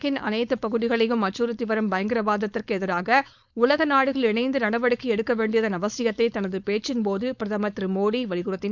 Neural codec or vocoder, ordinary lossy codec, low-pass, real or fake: codec, 16 kHz, 4.8 kbps, FACodec; none; 7.2 kHz; fake